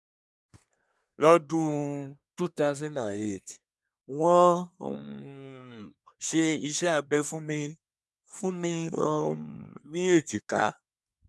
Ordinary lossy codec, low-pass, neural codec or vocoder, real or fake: none; none; codec, 24 kHz, 1 kbps, SNAC; fake